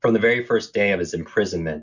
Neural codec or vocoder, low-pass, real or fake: none; 7.2 kHz; real